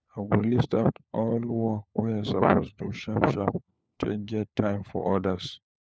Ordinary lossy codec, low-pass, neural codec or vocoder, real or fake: none; none; codec, 16 kHz, 4 kbps, FunCodec, trained on LibriTTS, 50 frames a second; fake